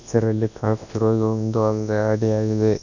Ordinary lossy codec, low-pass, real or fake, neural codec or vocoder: none; 7.2 kHz; fake; codec, 24 kHz, 0.9 kbps, WavTokenizer, large speech release